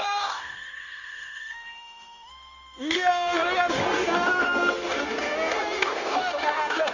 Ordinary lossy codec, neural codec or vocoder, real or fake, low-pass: none; codec, 16 kHz, 0.9 kbps, LongCat-Audio-Codec; fake; 7.2 kHz